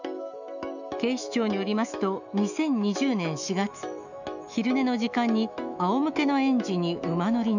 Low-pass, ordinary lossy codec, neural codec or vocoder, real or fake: 7.2 kHz; none; autoencoder, 48 kHz, 128 numbers a frame, DAC-VAE, trained on Japanese speech; fake